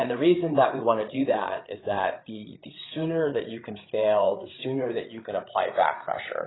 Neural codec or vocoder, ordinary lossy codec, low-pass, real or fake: codec, 16 kHz, 8 kbps, FreqCodec, larger model; AAC, 16 kbps; 7.2 kHz; fake